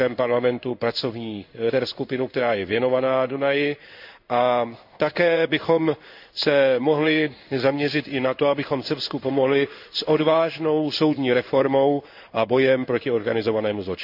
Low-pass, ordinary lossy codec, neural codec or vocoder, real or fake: 5.4 kHz; none; codec, 16 kHz in and 24 kHz out, 1 kbps, XY-Tokenizer; fake